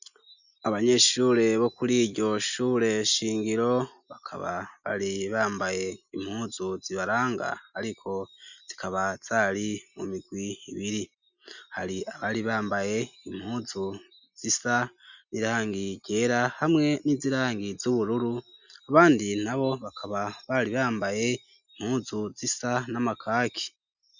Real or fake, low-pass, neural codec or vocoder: real; 7.2 kHz; none